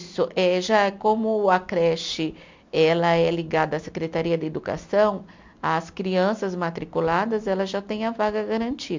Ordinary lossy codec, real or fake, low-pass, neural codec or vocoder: MP3, 64 kbps; real; 7.2 kHz; none